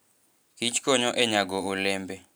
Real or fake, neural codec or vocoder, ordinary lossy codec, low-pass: real; none; none; none